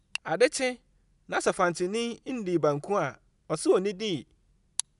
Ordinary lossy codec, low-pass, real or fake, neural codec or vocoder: none; 10.8 kHz; real; none